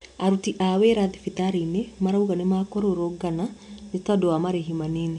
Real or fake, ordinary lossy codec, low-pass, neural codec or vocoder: real; none; 10.8 kHz; none